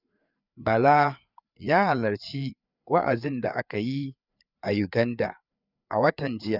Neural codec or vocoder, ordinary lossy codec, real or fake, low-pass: codec, 16 kHz, 4 kbps, FreqCodec, larger model; none; fake; 5.4 kHz